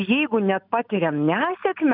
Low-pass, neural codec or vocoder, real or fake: 5.4 kHz; none; real